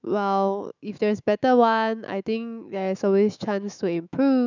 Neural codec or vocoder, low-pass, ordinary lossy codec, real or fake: autoencoder, 48 kHz, 128 numbers a frame, DAC-VAE, trained on Japanese speech; 7.2 kHz; none; fake